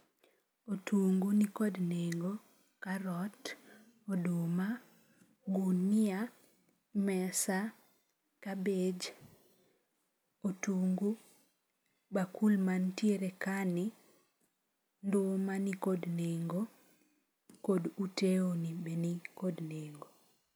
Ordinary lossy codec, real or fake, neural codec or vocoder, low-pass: none; real; none; none